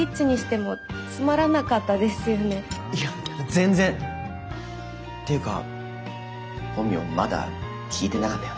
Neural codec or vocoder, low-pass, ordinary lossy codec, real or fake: none; none; none; real